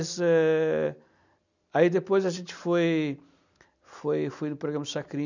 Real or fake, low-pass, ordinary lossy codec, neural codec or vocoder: real; 7.2 kHz; none; none